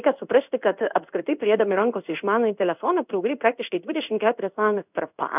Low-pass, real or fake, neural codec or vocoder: 3.6 kHz; fake; codec, 16 kHz in and 24 kHz out, 1 kbps, XY-Tokenizer